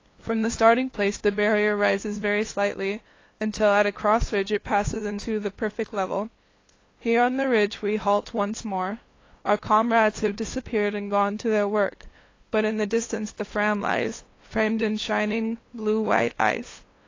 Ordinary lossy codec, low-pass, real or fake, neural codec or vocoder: AAC, 32 kbps; 7.2 kHz; fake; codec, 16 kHz, 2 kbps, FunCodec, trained on LibriTTS, 25 frames a second